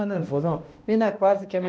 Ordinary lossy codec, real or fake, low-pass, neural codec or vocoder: none; fake; none; codec, 16 kHz, 1 kbps, X-Codec, HuBERT features, trained on balanced general audio